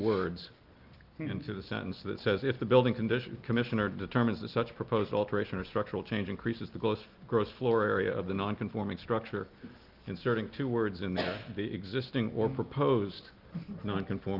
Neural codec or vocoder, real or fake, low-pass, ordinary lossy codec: none; real; 5.4 kHz; Opus, 32 kbps